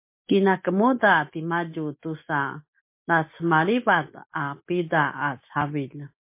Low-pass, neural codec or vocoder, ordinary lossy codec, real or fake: 3.6 kHz; none; MP3, 24 kbps; real